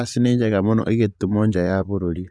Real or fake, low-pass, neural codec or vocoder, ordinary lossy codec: fake; none; vocoder, 22.05 kHz, 80 mel bands, Vocos; none